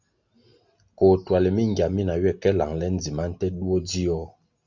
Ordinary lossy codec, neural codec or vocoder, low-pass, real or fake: Opus, 64 kbps; none; 7.2 kHz; real